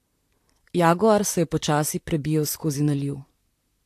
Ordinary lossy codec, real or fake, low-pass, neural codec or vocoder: AAC, 64 kbps; fake; 14.4 kHz; vocoder, 44.1 kHz, 128 mel bands, Pupu-Vocoder